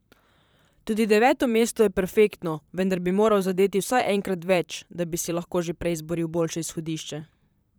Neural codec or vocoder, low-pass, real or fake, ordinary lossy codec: vocoder, 44.1 kHz, 128 mel bands, Pupu-Vocoder; none; fake; none